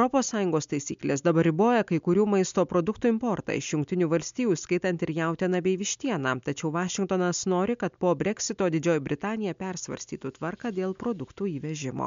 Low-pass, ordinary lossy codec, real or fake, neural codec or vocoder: 7.2 kHz; MP3, 64 kbps; real; none